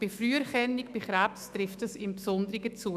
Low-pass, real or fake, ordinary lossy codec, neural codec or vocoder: 14.4 kHz; fake; none; autoencoder, 48 kHz, 128 numbers a frame, DAC-VAE, trained on Japanese speech